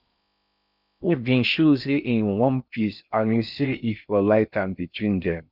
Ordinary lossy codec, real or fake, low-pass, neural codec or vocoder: none; fake; 5.4 kHz; codec, 16 kHz in and 24 kHz out, 0.6 kbps, FocalCodec, streaming, 4096 codes